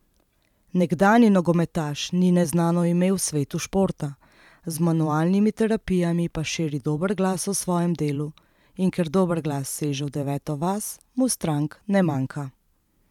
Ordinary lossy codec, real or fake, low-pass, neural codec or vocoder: none; fake; 19.8 kHz; vocoder, 44.1 kHz, 128 mel bands every 512 samples, BigVGAN v2